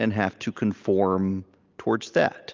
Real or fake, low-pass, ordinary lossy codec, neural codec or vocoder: real; 7.2 kHz; Opus, 32 kbps; none